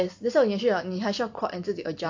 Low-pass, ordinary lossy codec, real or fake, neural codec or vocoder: 7.2 kHz; AAC, 48 kbps; real; none